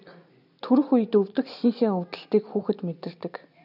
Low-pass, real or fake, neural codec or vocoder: 5.4 kHz; real; none